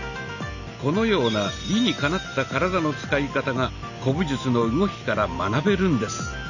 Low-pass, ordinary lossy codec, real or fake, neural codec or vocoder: 7.2 kHz; none; real; none